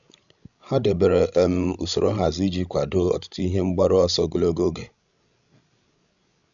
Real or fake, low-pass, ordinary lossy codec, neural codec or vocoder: fake; 7.2 kHz; none; codec, 16 kHz, 16 kbps, FreqCodec, larger model